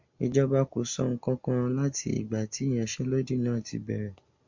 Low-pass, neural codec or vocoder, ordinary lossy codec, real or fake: 7.2 kHz; none; MP3, 48 kbps; real